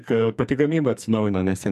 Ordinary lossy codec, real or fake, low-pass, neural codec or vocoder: MP3, 96 kbps; fake; 14.4 kHz; codec, 44.1 kHz, 2.6 kbps, SNAC